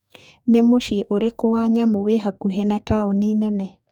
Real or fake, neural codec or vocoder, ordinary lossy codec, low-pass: fake; codec, 44.1 kHz, 2.6 kbps, DAC; none; 19.8 kHz